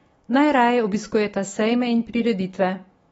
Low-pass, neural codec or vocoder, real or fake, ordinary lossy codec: 19.8 kHz; autoencoder, 48 kHz, 128 numbers a frame, DAC-VAE, trained on Japanese speech; fake; AAC, 24 kbps